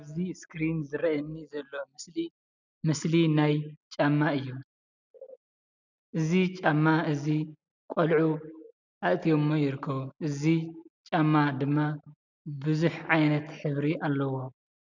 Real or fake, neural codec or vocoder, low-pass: real; none; 7.2 kHz